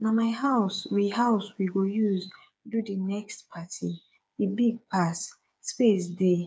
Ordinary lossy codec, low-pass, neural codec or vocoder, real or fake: none; none; codec, 16 kHz, 8 kbps, FreqCodec, smaller model; fake